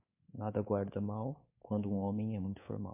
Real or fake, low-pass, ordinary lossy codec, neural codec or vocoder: fake; 3.6 kHz; MP3, 32 kbps; codec, 24 kHz, 3.1 kbps, DualCodec